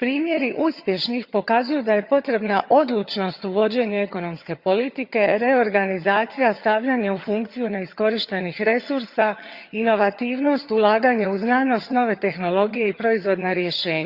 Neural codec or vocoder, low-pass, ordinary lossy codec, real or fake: vocoder, 22.05 kHz, 80 mel bands, HiFi-GAN; 5.4 kHz; Opus, 64 kbps; fake